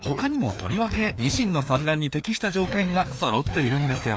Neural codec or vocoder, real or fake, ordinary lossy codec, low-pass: codec, 16 kHz, 2 kbps, FreqCodec, larger model; fake; none; none